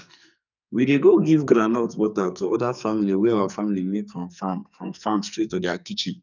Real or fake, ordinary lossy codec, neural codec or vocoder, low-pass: fake; none; codec, 44.1 kHz, 2.6 kbps, SNAC; 7.2 kHz